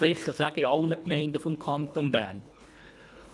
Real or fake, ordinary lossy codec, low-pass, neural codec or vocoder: fake; none; none; codec, 24 kHz, 1.5 kbps, HILCodec